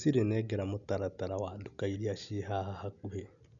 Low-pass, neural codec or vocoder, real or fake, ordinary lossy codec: 7.2 kHz; none; real; none